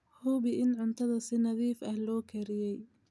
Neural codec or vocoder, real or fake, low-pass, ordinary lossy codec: none; real; none; none